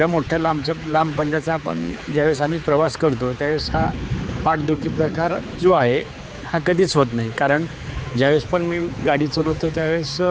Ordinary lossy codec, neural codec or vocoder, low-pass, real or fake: none; codec, 16 kHz, 4 kbps, X-Codec, HuBERT features, trained on general audio; none; fake